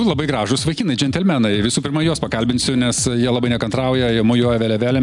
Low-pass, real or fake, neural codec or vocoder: 10.8 kHz; real; none